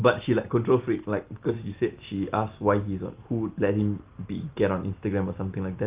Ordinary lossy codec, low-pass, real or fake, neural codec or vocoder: Opus, 24 kbps; 3.6 kHz; real; none